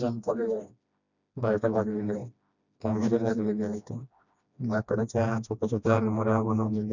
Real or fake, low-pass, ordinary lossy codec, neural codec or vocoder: fake; 7.2 kHz; none; codec, 16 kHz, 1 kbps, FreqCodec, smaller model